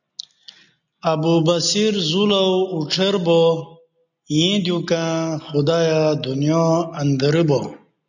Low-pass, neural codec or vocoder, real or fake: 7.2 kHz; none; real